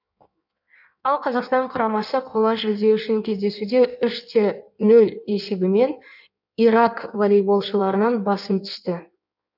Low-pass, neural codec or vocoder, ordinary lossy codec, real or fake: 5.4 kHz; codec, 16 kHz in and 24 kHz out, 1.1 kbps, FireRedTTS-2 codec; none; fake